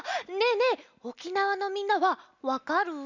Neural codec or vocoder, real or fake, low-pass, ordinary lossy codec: none; real; 7.2 kHz; none